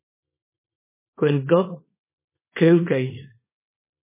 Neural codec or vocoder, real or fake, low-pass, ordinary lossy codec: codec, 24 kHz, 0.9 kbps, WavTokenizer, small release; fake; 3.6 kHz; MP3, 16 kbps